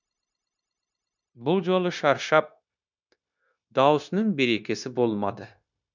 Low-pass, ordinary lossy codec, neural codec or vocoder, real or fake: 7.2 kHz; none; codec, 16 kHz, 0.9 kbps, LongCat-Audio-Codec; fake